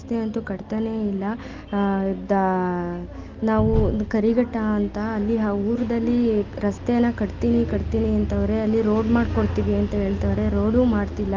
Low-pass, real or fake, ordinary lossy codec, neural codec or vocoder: 7.2 kHz; real; Opus, 32 kbps; none